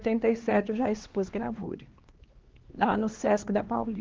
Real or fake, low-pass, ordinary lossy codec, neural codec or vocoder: fake; 7.2 kHz; Opus, 16 kbps; codec, 16 kHz, 2 kbps, X-Codec, HuBERT features, trained on LibriSpeech